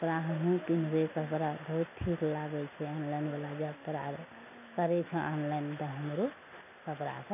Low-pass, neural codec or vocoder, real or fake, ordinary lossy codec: 3.6 kHz; none; real; none